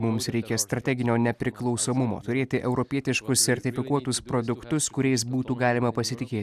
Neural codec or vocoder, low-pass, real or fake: none; 14.4 kHz; real